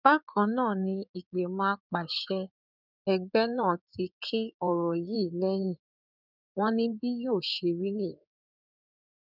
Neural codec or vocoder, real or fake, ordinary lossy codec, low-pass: codec, 16 kHz in and 24 kHz out, 2.2 kbps, FireRedTTS-2 codec; fake; none; 5.4 kHz